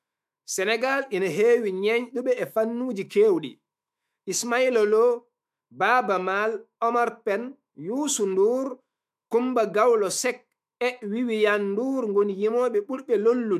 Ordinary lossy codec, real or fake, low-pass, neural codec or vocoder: MP3, 96 kbps; fake; 14.4 kHz; autoencoder, 48 kHz, 128 numbers a frame, DAC-VAE, trained on Japanese speech